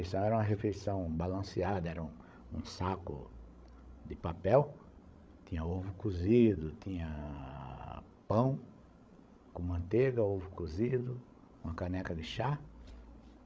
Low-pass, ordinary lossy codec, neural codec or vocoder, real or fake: none; none; codec, 16 kHz, 16 kbps, FreqCodec, larger model; fake